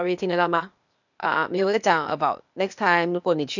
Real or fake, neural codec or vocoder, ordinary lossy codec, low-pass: fake; codec, 16 kHz, 0.8 kbps, ZipCodec; none; 7.2 kHz